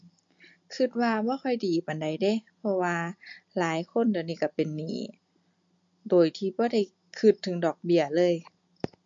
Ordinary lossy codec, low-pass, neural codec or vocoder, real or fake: MP3, 48 kbps; 7.2 kHz; none; real